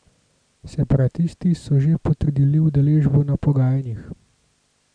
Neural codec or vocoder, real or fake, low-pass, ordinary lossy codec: none; real; 9.9 kHz; none